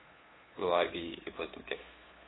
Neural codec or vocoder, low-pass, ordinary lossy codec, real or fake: codec, 16 kHz, 2 kbps, FunCodec, trained on LibriTTS, 25 frames a second; 7.2 kHz; AAC, 16 kbps; fake